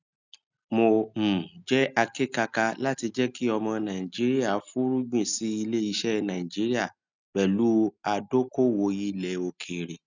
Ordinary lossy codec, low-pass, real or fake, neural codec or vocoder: none; 7.2 kHz; real; none